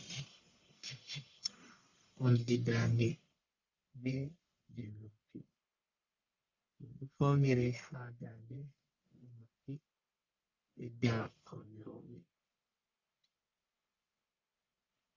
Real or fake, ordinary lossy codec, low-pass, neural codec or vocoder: fake; Opus, 64 kbps; 7.2 kHz; codec, 44.1 kHz, 1.7 kbps, Pupu-Codec